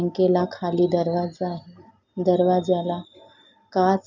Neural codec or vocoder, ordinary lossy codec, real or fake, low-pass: none; none; real; 7.2 kHz